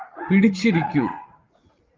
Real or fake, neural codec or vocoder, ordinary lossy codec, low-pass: fake; vocoder, 44.1 kHz, 80 mel bands, Vocos; Opus, 24 kbps; 7.2 kHz